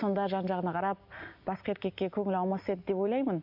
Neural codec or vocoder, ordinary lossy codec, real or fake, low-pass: none; MP3, 48 kbps; real; 5.4 kHz